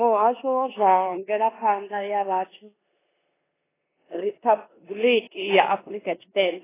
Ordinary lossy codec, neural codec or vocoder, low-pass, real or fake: AAC, 16 kbps; codec, 16 kHz in and 24 kHz out, 0.9 kbps, LongCat-Audio-Codec, four codebook decoder; 3.6 kHz; fake